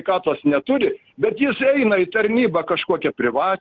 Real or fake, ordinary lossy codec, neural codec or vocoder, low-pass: real; Opus, 16 kbps; none; 7.2 kHz